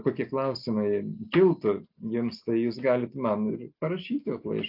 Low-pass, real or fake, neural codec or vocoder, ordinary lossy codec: 5.4 kHz; real; none; AAC, 48 kbps